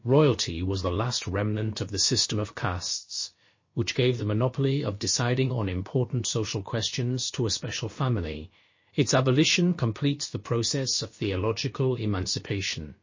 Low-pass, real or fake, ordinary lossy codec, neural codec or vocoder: 7.2 kHz; fake; MP3, 32 kbps; codec, 16 kHz, about 1 kbps, DyCAST, with the encoder's durations